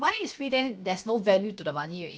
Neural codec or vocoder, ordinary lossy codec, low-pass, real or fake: codec, 16 kHz, 0.7 kbps, FocalCodec; none; none; fake